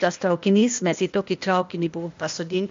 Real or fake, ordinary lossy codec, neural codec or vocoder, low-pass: fake; MP3, 48 kbps; codec, 16 kHz, 0.8 kbps, ZipCodec; 7.2 kHz